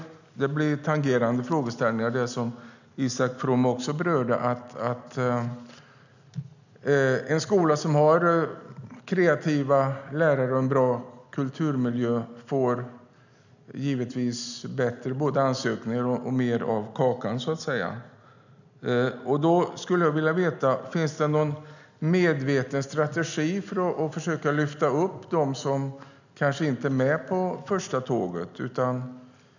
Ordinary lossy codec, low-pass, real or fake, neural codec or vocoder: none; 7.2 kHz; real; none